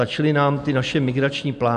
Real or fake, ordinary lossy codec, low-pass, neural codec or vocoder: real; MP3, 96 kbps; 10.8 kHz; none